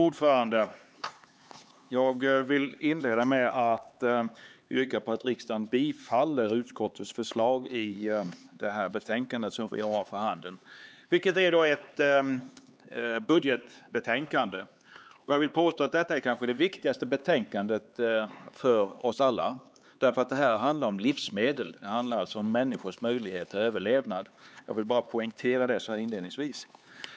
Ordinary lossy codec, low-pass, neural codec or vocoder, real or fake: none; none; codec, 16 kHz, 4 kbps, X-Codec, HuBERT features, trained on LibriSpeech; fake